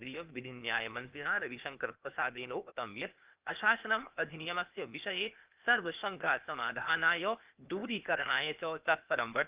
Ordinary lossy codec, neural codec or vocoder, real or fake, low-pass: Opus, 24 kbps; codec, 16 kHz, 0.8 kbps, ZipCodec; fake; 3.6 kHz